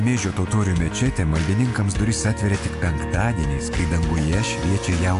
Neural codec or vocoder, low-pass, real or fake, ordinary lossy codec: none; 10.8 kHz; real; AAC, 64 kbps